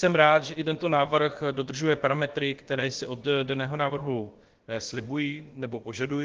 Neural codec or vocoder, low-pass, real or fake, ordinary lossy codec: codec, 16 kHz, about 1 kbps, DyCAST, with the encoder's durations; 7.2 kHz; fake; Opus, 16 kbps